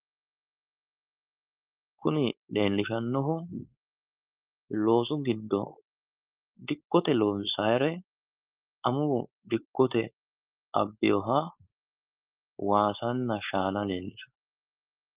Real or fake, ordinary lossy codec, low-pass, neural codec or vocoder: fake; Opus, 24 kbps; 3.6 kHz; codec, 16 kHz, 4.8 kbps, FACodec